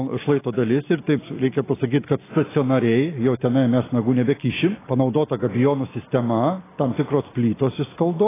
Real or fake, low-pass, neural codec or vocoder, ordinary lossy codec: real; 3.6 kHz; none; AAC, 16 kbps